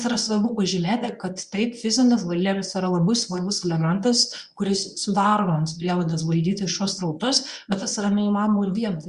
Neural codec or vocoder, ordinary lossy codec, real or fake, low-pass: codec, 24 kHz, 0.9 kbps, WavTokenizer, medium speech release version 1; Opus, 64 kbps; fake; 10.8 kHz